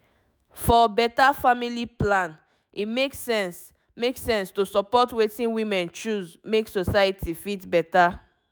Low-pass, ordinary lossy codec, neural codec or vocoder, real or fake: none; none; autoencoder, 48 kHz, 128 numbers a frame, DAC-VAE, trained on Japanese speech; fake